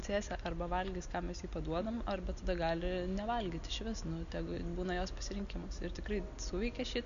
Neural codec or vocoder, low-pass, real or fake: none; 7.2 kHz; real